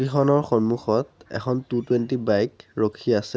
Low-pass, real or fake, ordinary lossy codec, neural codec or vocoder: none; real; none; none